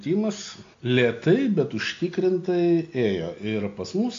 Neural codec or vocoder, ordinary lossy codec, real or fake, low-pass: none; AAC, 48 kbps; real; 7.2 kHz